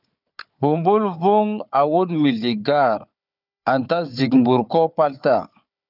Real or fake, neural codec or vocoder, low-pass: fake; codec, 16 kHz, 4 kbps, FunCodec, trained on Chinese and English, 50 frames a second; 5.4 kHz